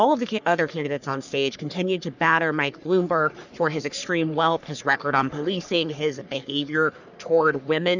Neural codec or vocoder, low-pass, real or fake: codec, 44.1 kHz, 3.4 kbps, Pupu-Codec; 7.2 kHz; fake